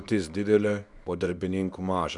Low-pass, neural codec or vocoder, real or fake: 10.8 kHz; codec, 24 kHz, 0.9 kbps, WavTokenizer, small release; fake